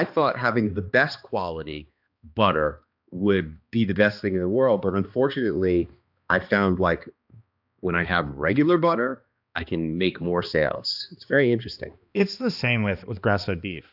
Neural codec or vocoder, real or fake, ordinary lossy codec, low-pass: codec, 16 kHz, 2 kbps, X-Codec, HuBERT features, trained on balanced general audio; fake; AAC, 48 kbps; 5.4 kHz